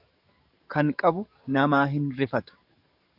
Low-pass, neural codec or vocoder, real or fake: 5.4 kHz; codec, 44.1 kHz, 7.8 kbps, DAC; fake